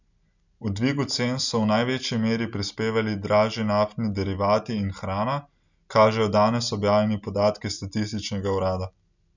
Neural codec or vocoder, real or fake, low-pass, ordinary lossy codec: none; real; 7.2 kHz; none